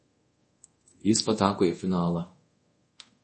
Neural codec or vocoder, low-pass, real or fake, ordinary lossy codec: codec, 24 kHz, 0.5 kbps, DualCodec; 10.8 kHz; fake; MP3, 32 kbps